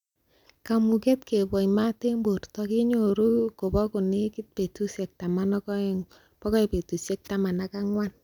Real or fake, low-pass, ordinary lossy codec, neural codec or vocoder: real; 19.8 kHz; none; none